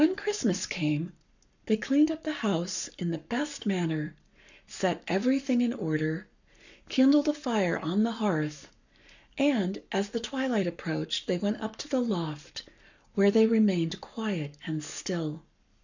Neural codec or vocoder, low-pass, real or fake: codec, 44.1 kHz, 7.8 kbps, Pupu-Codec; 7.2 kHz; fake